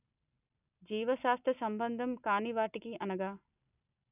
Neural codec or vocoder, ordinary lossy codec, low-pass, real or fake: none; none; 3.6 kHz; real